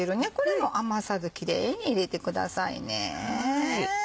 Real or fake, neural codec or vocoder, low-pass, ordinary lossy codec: real; none; none; none